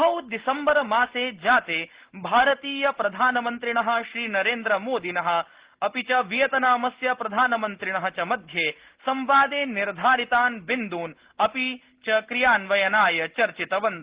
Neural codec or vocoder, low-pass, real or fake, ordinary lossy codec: none; 3.6 kHz; real; Opus, 16 kbps